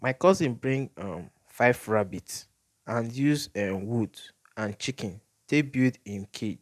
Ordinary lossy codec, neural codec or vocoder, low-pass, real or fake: none; none; 14.4 kHz; real